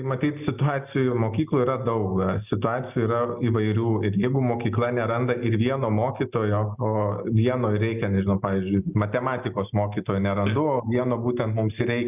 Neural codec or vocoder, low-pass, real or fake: none; 3.6 kHz; real